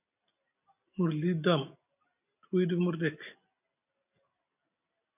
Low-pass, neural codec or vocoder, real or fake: 3.6 kHz; none; real